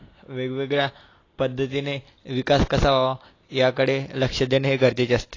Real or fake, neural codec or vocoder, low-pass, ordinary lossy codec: real; none; 7.2 kHz; AAC, 32 kbps